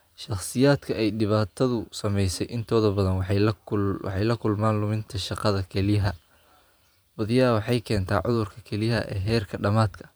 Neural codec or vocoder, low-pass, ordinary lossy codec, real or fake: vocoder, 44.1 kHz, 128 mel bands every 512 samples, BigVGAN v2; none; none; fake